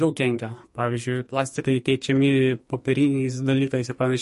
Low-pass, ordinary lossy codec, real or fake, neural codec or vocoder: 14.4 kHz; MP3, 48 kbps; fake; codec, 44.1 kHz, 2.6 kbps, SNAC